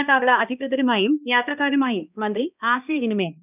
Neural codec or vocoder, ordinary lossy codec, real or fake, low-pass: codec, 16 kHz, 1 kbps, X-Codec, HuBERT features, trained on balanced general audio; none; fake; 3.6 kHz